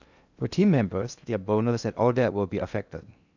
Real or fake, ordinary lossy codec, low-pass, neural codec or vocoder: fake; none; 7.2 kHz; codec, 16 kHz in and 24 kHz out, 0.6 kbps, FocalCodec, streaming, 2048 codes